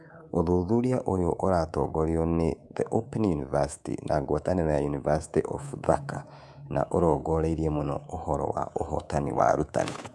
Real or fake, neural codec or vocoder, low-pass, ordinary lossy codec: fake; codec, 24 kHz, 3.1 kbps, DualCodec; none; none